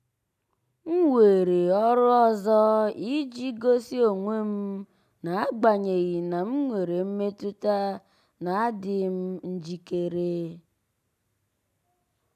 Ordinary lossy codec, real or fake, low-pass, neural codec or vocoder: none; real; 14.4 kHz; none